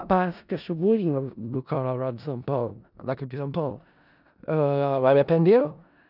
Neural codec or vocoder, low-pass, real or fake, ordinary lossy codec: codec, 16 kHz in and 24 kHz out, 0.4 kbps, LongCat-Audio-Codec, four codebook decoder; 5.4 kHz; fake; none